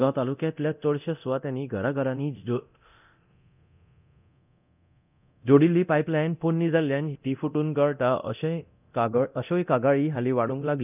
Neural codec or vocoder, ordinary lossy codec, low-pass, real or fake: codec, 24 kHz, 0.9 kbps, DualCodec; none; 3.6 kHz; fake